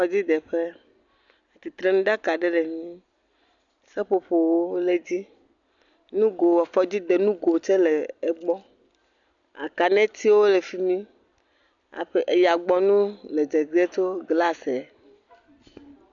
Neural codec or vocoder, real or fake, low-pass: none; real; 7.2 kHz